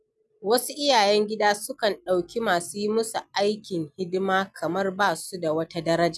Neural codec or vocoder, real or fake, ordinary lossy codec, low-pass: none; real; none; none